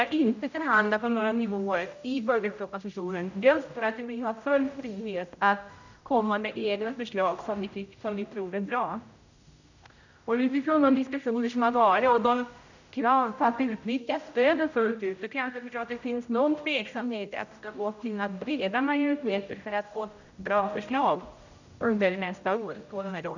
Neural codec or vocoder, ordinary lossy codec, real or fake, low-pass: codec, 16 kHz, 0.5 kbps, X-Codec, HuBERT features, trained on general audio; none; fake; 7.2 kHz